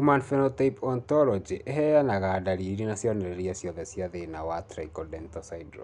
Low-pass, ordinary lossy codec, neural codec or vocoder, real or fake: 10.8 kHz; none; none; real